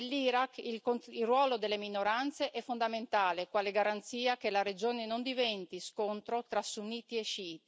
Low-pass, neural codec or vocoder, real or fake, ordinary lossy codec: none; none; real; none